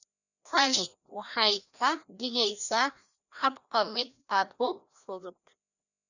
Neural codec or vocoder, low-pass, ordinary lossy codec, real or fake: codec, 16 kHz, 1 kbps, FreqCodec, larger model; 7.2 kHz; none; fake